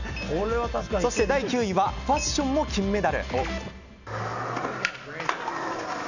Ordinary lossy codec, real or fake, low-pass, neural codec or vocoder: none; real; 7.2 kHz; none